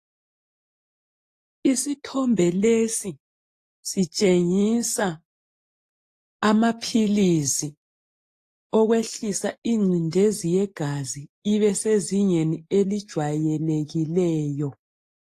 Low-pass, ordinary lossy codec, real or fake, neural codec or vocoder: 14.4 kHz; AAC, 48 kbps; real; none